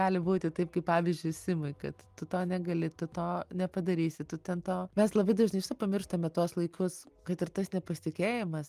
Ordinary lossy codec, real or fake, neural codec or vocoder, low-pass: Opus, 32 kbps; real; none; 14.4 kHz